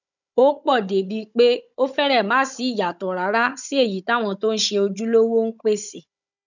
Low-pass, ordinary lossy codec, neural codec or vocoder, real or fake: 7.2 kHz; none; codec, 16 kHz, 16 kbps, FunCodec, trained on Chinese and English, 50 frames a second; fake